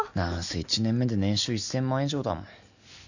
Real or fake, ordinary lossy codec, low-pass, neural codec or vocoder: real; none; 7.2 kHz; none